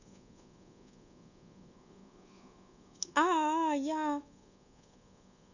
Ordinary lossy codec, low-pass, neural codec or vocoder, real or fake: none; 7.2 kHz; codec, 24 kHz, 1.2 kbps, DualCodec; fake